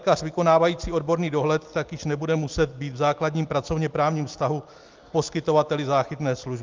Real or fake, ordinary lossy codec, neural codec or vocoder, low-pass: real; Opus, 24 kbps; none; 7.2 kHz